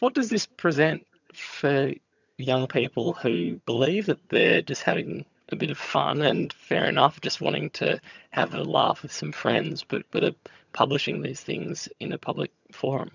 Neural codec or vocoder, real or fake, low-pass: vocoder, 22.05 kHz, 80 mel bands, HiFi-GAN; fake; 7.2 kHz